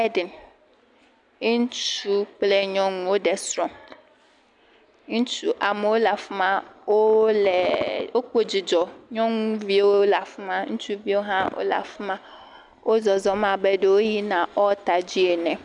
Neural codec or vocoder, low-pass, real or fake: none; 10.8 kHz; real